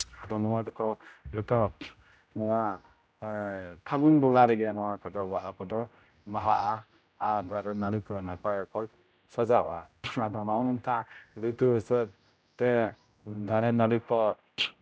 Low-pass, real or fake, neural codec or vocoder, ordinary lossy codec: none; fake; codec, 16 kHz, 0.5 kbps, X-Codec, HuBERT features, trained on general audio; none